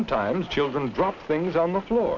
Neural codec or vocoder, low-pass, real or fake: none; 7.2 kHz; real